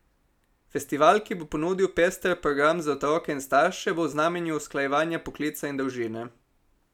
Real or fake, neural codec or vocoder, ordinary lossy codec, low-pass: real; none; none; 19.8 kHz